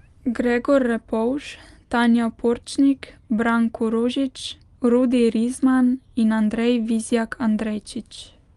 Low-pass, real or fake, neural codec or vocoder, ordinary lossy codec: 10.8 kHz; real; none; Opus, 32 kbps